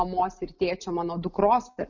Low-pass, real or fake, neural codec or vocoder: 7.2 kHz; real; none